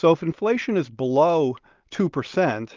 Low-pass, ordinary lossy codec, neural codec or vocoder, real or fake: 7.2 kHz; Opus, 32 kbps; none; real